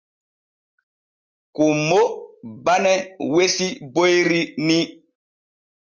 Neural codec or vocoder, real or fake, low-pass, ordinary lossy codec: none; real; 7.2 kHz; Opus, 64 kbps